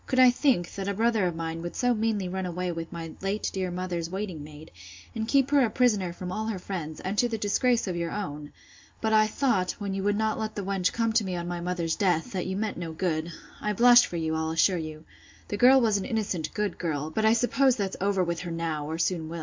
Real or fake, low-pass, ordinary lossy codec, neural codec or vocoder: real; 7.2 kHz; MP3, 64 kbps; none